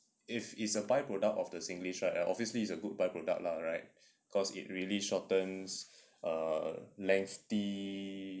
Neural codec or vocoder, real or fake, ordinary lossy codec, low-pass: none; real; none; none